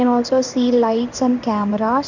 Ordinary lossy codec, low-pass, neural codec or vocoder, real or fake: none; 7.2 kHz; codec, 16 kHz in and 24 kHz out, 1 kbps, XY-Tokenizer; fake